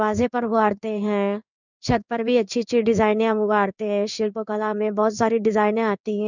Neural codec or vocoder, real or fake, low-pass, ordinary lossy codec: codec, 16 kHz in and 24 kHz out, 1 kbps, XY-Tokenizer; fake; 7.2 kHz; none